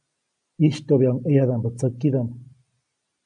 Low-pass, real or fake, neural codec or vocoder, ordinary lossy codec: 9.9 kHz; real; none; AAC, 64 kbps